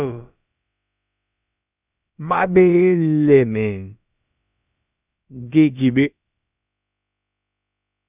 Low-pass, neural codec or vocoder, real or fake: 3.6 kHz; codec, 16 kHz, about 1 kbps, DyCAST, with the encoder's durations; fake